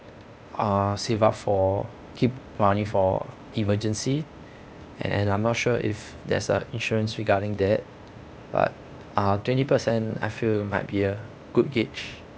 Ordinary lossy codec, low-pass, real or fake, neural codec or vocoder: none; none; fake; codec, 16 kHz, 0.8 kbps, ZipCodec